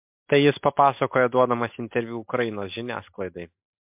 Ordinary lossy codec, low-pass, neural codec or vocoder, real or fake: MP3, 32 kbps; 3.6 kHz; none; real